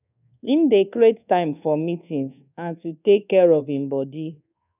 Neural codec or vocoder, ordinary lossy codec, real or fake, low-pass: codec, 24 kHz, 1.2 kbps, DualCodec; none; fake; 3.6 kHz